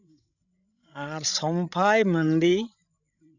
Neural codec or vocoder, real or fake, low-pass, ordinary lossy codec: codec, 16 kHz, 16 kbps, FreqCodec, larger model; fake; 7.2 kHz; none